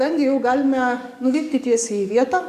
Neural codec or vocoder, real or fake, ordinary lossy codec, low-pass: codec, 44.1 kHz, 7.8 kbps, DAC; fake; AAC, 96 kbps; 14.4 kHz